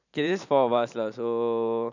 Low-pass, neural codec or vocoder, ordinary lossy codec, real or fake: 7.2 kHz; vocoder, 44.1 kHz, 128 mel bands every 512 samples, BigVGAN v2; none; fake